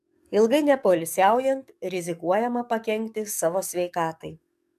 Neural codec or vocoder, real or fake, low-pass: codec, 44.1 kHz, 7.8 kbps, DAC; fake; 14.4 kHz